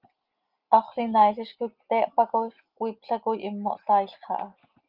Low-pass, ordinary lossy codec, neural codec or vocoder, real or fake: 5.4 kHz; Opus, 32 kbps; none; real